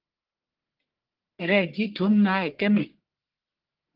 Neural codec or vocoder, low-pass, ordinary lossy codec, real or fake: codec, 44.1 kHz, 1.7 kbps, Pupu-Codec; 5.4 kHz; Opus, 16 kbps; fake